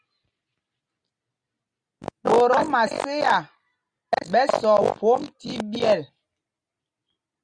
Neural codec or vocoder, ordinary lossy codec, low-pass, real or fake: none; Opus, 64 kbps; 9.9 kHz; real